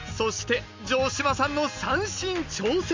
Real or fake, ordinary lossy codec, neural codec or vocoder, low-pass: real; MP3, 64 kbps; none; 7.2 kHz